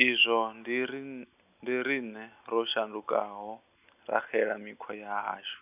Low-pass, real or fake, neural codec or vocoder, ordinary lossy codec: 3.6 kHz; real; none; none